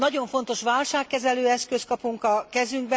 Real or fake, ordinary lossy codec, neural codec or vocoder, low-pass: real; none; none; none